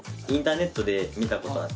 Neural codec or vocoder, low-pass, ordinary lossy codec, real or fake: none; none; none; real